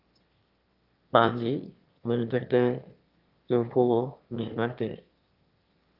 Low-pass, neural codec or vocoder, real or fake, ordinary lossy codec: 5.4 kHz; autoencoder, 22.05 kHz, a latent of 192 numbers a frame, VITS, trained on one speaker; fake; Opus, 24 kbps